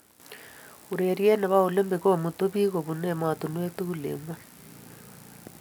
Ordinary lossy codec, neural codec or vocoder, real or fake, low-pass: none; none; real; none